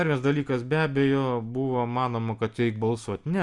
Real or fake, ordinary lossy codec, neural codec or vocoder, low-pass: real; Opus, 64 kbps; none; 10.8 kHz